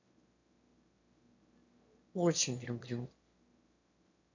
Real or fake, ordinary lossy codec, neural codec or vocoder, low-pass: fake; AAC, 48 kbps; autoencoder, 22.05 kHz, a latent of 192 numbers a frame, VITS, trained on one speaker; 7.2 kHz